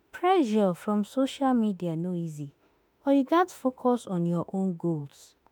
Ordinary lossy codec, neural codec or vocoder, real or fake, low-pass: none; autoencoder, 48 kHz, 32 numbers a frame, DAC-VAE, trained on Japanese speech; fake; none